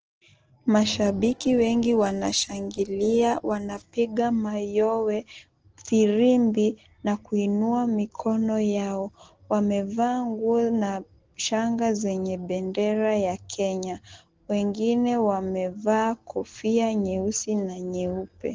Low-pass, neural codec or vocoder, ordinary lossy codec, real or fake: 7.2 kHz; none; Opus, 16 kbps; real